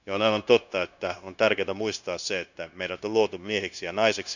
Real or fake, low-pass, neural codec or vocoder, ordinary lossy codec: fake; 7.2 kHz; codec, 16 kHz, 0.9 kbps, LongCat-Audio-Codec; none